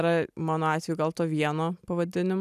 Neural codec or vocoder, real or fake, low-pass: none; real; 14.4 kHz